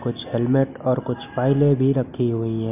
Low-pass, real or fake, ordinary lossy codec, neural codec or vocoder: 3.6 kHz; real; none; none